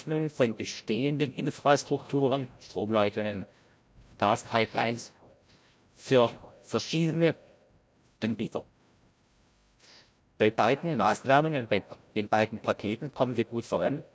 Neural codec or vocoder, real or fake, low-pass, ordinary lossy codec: codec, 16 kHz, 0.5 kbps, FreqCodec, larger model; fake; none; none